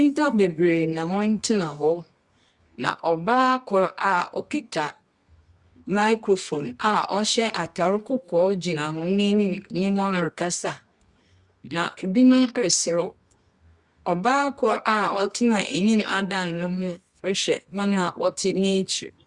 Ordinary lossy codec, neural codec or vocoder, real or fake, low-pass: Opus, 64 kbps; codec, 24 kHz, 0.9 kbps, WavTokenizer, medium music audio release; fake; 10.8 kHz